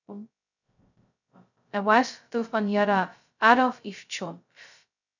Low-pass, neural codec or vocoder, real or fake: 7.2 kHz; codec, 16 kHz, 0.2 kbps, FocalCodec; fake